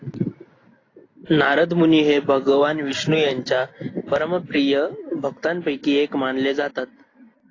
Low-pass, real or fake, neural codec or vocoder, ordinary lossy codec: 7.2 kHz; real; none; AAC, 32 kbps